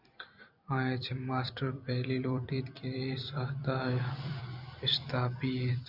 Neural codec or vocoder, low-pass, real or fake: vocoder, 44.1 kHz, 128 mel bands every 256 samples, BigVGAN v2; 5.4 kHz; fake